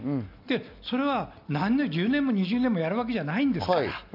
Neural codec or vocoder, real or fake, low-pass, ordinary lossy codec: none; real; 5.4 kHz; none